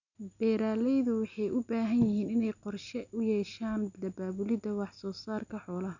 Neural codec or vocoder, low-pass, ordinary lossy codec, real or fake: none; 7.2 kHz; none; real